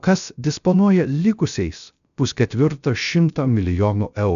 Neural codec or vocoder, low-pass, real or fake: codec, 16 kHz, 0.3 kbps, FocalCodec; 7.2 kHz; fake